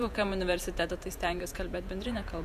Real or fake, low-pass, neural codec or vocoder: fake; 14.4 kHz; vocoder, 48 kHz, 128 mel bands, Vocos